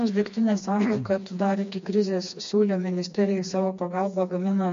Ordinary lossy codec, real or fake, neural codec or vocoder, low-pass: MP3, 48 kbps; fake; codec, 16 kHz, 2 kbps, FreqCodec, smaller model; 7.2 kHz